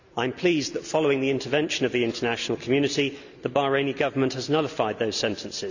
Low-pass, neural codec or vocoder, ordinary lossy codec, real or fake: 7.2 kHz; none; none; real